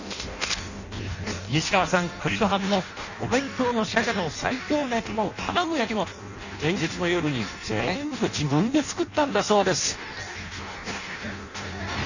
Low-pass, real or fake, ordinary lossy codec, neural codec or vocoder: 7.2 kHz; fake; none; codec, 16 kHz in and 24 kHz out, 0.6 kbps, FireRedTTS-2 codec